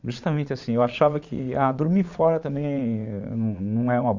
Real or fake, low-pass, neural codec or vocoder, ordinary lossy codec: fake; 7.2 kHz; vocoder, 22.05 kHz, 80 mel bands, Vocos; Opus, 64 kbps